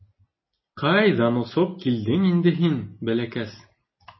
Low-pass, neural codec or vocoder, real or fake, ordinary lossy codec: 7.2 kHz; none; real; MP3, 24 kbps